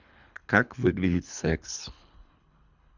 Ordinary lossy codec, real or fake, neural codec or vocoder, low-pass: none; fake; codec, 24 kHz, 3 kbps, HILCodec; 7.2 kHz